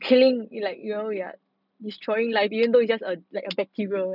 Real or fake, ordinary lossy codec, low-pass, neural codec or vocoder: real; none; 5.4 kHz; none